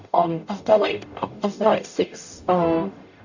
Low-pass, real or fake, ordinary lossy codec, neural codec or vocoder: 7.2 kHz; fake; none; codec, 44.1 kHz, 0.9 kbps, DAC